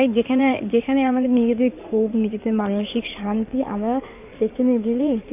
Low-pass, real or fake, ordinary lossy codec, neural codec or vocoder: 3.6 kHz; fake; none; codec, 16 kHz in and 24 kHz out, 2.2 kbps, FireRedTTS-2 codec